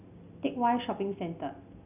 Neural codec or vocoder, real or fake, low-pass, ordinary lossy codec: none; real; 3.6 kHz; none